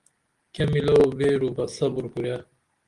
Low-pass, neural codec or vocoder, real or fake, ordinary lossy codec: 10.8 kHz; none; real; Opus, 24 kbps